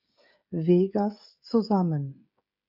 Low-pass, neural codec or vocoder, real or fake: 5.4 kHz; codec, 16 kHz, 16 kbps, FreqCodec, smaller model; fake